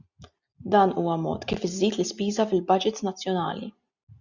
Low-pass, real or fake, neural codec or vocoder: 7.2 kHz; real; none